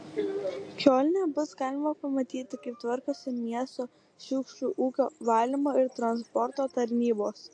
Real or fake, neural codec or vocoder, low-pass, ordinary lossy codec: real; none; 9.9 kHz; AAC, 48 kbps